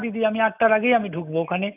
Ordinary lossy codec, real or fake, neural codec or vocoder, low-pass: none; real; none; 3.6 kHz